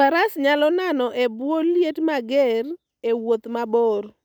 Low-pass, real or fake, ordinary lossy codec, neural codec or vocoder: 19.8 kHz; real; none; none